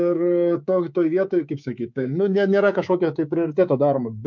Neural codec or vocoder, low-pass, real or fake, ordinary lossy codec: codec, 24 kHz, 3.1 kbps, DualCodec; 7.2 kHz; fake; MP3, 64 kbps